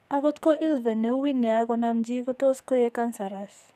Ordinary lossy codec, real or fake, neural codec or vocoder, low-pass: none; fake; codec, 44.1 kHz, 2.6 kbps, SNAC; 14.4 kHz